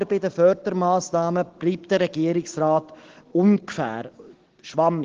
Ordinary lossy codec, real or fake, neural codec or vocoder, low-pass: Opus, 16 kbps; real; none; 7.2 kHz